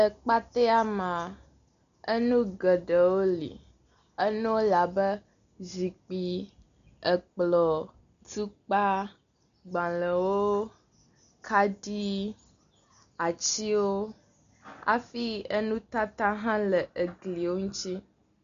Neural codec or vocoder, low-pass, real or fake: none; 7.2 kHz; real